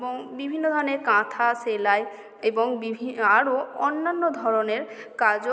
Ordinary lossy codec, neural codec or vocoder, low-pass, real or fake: none; none; none; real